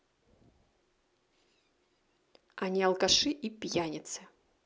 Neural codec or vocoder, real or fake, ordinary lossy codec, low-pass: none; real; none; none